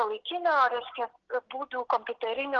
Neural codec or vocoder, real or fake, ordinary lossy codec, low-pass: none; real; Opus, 16 kbps; 7.2 kHz